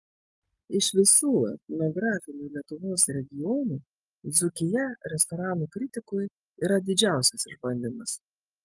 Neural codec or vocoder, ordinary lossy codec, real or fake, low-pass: none; Opus, 32 kbps; real; 10.8 kHz